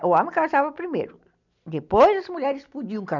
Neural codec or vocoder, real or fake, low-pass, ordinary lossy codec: none; real; 7.2 kHz; none